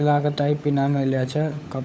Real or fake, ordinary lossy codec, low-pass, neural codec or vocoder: fake; none; none; codec, 16 kHz, 16 kbps, FunCodec, trained on LibriTTS, 50 frames a second